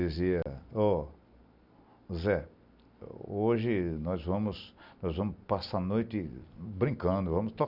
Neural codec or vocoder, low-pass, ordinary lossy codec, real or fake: none; 5.4 kHz; none; real